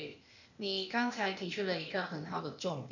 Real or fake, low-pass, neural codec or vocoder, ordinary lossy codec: fake; 7.2 kHz; codec, 16 kHz, 0.8 kbps, ZipCodec; none